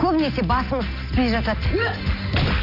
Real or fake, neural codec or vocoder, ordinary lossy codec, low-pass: real; none; none; 5.4 kHz